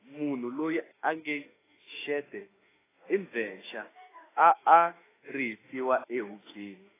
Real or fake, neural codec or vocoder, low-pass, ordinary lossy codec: fake; autoencoder, 48 kHz, 32 numbers a frame, DAC-VAE, trained on Japanese speech; 3.6 kHz; AAC, 16 kbps